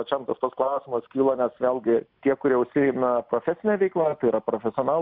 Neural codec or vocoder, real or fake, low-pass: none; real; 5.4 kHz